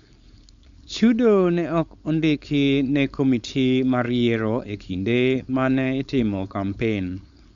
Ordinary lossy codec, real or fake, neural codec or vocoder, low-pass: Opus, 64 kbps; fake; codec, 16 kHz, 4.8 kbps, FACodec; 7.2 kHz